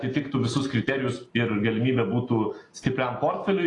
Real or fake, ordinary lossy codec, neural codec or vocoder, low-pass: real; AAC, 32 kbps; none; 10.8 kHz